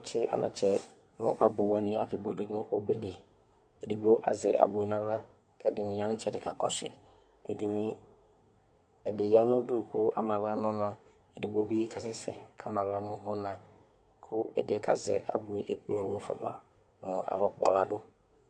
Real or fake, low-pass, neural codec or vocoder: fake; 9.9 kHz; codec, 24 kHz, 1 kbps, SNAC